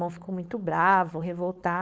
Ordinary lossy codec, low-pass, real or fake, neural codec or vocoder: none; none; fake; codec, 16 kHz, 4.8 kbps, FACodec